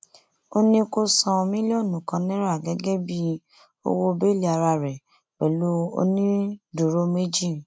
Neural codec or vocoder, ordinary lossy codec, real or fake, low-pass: none; none; real; none